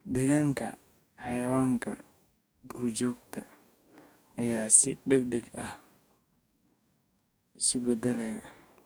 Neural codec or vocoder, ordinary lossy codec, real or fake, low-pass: codec, 44.1 kHz, 2.6 kbps, DAC; none; fake; none